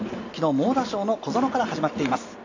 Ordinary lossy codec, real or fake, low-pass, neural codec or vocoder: AAC, 48 kbps; real; 7.2 kHz; none